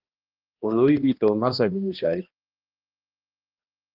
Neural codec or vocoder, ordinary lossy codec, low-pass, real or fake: codec, 16 kHz, 1 kbps, X-Codec, HuBERT features, trained on balanced general audio; Opus, 24 kbps; 5.4 kHz; fake